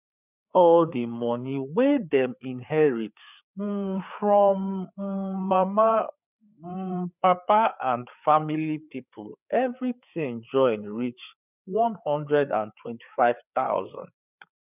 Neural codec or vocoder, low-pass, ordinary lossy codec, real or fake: codec, 16 kHz, 4 kbps, FreqCodec, larger model; 3.6 kHz; none; fake